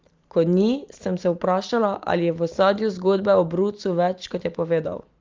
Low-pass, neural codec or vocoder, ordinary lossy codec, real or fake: 7.2 kHz; none; Opus, 32 kbps; real